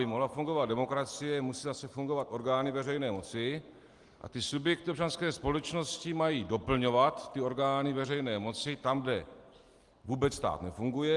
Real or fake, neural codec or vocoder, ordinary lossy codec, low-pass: real; none; Opus, 24 kbps; 10.8 kHz